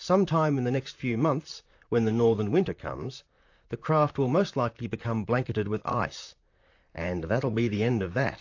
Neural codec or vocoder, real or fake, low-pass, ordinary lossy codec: vocoder, 44.1 kHz, 128 mel bands, Pupu-Vocoder; fake; 7.2 kHz; AAC, 48 kbps